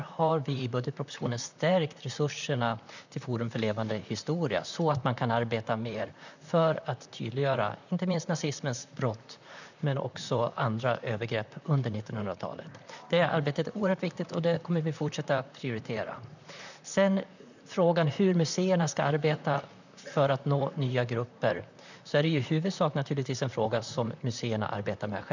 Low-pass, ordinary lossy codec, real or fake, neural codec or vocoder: 7.2 kHz; none; fake; vocoder, 44.1 kHz, 128 mel bands, Pupu-Vocoder